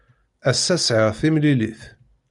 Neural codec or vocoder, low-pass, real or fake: none; 10.8 kHz; real